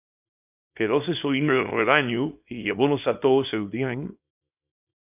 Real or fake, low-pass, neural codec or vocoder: fake; 3.6 kHz; codec, 24 kHz, 0.9 kbps, WavTokenizer, small release